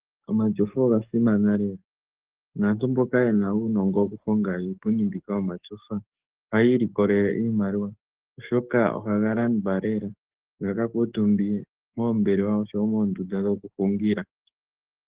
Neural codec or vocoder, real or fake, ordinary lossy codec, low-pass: codec, 44.1 kHz, 7.8 kbps, DAC; fake; Opus, 16 kbps; 3.6 kHz